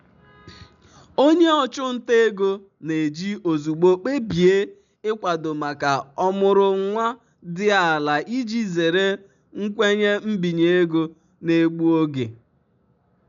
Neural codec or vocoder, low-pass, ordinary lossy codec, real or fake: none; 7.2 kHz; none; real